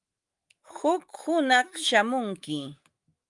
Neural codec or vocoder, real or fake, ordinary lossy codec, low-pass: autoencoder, 48 kHz, 128 numbers a frame, DAC-VAE, trained on Japanese speech; fake; Opus, 32 kbps; 10.8 kHz